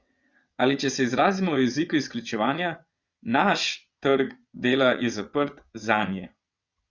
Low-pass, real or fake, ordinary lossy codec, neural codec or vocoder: 7.2 kHz; fake; Opus, 64 kbps; vocoder, 22.05 kHz, 80 mel bands, WaveNeXt